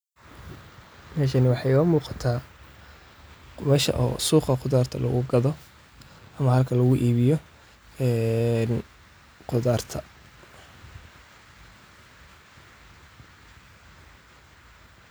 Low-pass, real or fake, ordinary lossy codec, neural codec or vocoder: none; real; none; none